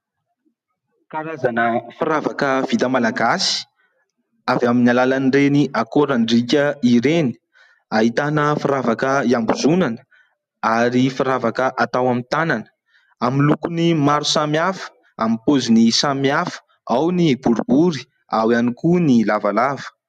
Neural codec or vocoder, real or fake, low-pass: none; real; 14.4 kHz